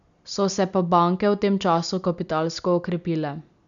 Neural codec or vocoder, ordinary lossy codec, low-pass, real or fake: none; none; 7.2 kHz; real